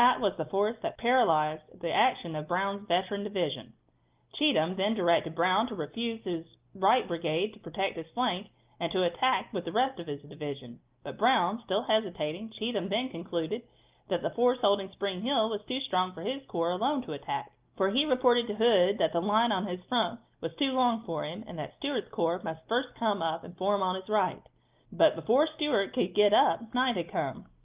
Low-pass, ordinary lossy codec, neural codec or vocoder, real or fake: 3.6 kHz; Opus, 32 kbps; none; real